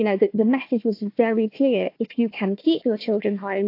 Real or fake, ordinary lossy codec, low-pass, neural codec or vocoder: fake; AAC, 32 kbps; 5.4 kHz; codec, 16 kHz, 1 kbps, FunCodec, trained on Chinese and English, 50 frames a second